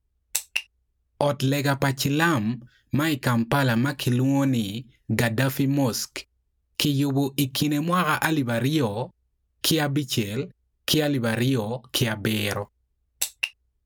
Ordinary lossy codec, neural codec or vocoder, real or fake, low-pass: none; none; real; none